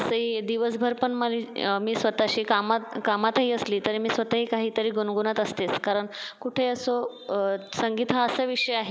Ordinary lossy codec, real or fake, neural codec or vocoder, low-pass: none; real; none; none